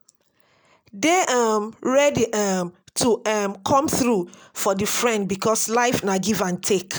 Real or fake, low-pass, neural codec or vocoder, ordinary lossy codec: real; none; none; none